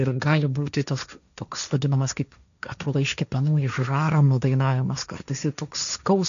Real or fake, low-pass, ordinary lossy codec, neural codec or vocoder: fake; 7.2 kHz; MP3, 96 kbps; codec, 16 kHz, 1.1 kbps, Voila-Tokenizer